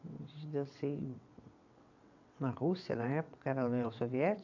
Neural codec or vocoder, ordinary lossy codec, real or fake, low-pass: vocoder, 22.05 kHz, 80 mel bands, WaveNeXt; AAC, 48 kbps; fake; 7.2 kHz